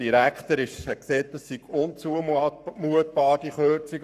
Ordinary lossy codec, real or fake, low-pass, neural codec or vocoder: none; fake; 14.4 kHz; codec, 44.1 kHz, 7.8 kbps, Pupu-Codec